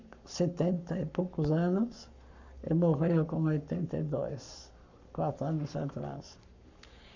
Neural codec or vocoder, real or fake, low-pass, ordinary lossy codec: codec, 44.1 kHz, 7.8 kbps, Pupu-Codec; fake; 7.2 kHz; none